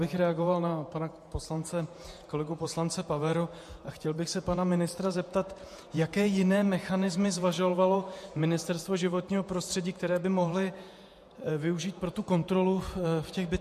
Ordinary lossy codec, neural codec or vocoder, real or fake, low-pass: MP3, 64 kbps; vocoder, 48 kHz, 128 mel bands, Vocos; fake; 14.4 kHz